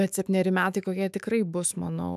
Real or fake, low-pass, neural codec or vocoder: fake; 14.4 kHz; autoencoder, 48 kHz, 128 numbers a frame, DAC-VAE, trained on Japanese speech